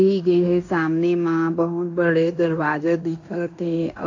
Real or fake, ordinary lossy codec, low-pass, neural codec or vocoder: fake; AAC, 48 kbps; 7.2 kHz; codec, 16 kHz in and 24 kHz out, 0.9 kbps, LongCat-Audio-Codec, fine tuned four codebook decoder